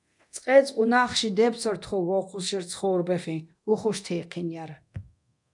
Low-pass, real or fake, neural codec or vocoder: 10.8 kHz; fake; codec, 24 kHz, 0.9 kbps, DualCodec